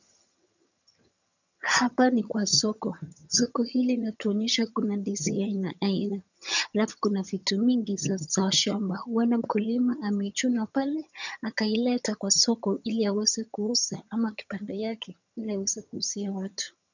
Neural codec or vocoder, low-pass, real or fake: vocoder, 22.05 kHz, 80 mel bands, HiFi-GAN; 7.2 kHz; fake